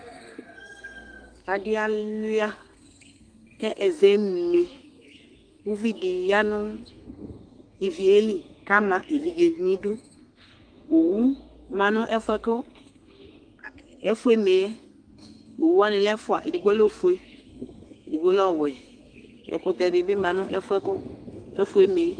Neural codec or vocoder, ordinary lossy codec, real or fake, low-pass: codec, 32 kHz, 1.9 kbps, SNAC; Opus, 32 kbps; fake; 9.9 kHz